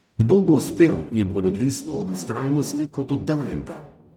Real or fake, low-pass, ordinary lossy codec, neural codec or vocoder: fake; 19.8 kHz; none; codec, 44.1 kHz, 0.9 kbps, DAC